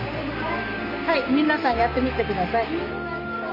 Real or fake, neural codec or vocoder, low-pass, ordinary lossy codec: real; none; 5.4 kHz; MP3, 48 kbps